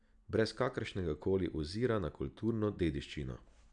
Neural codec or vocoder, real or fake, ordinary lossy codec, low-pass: none; real; none; 9.9 kHz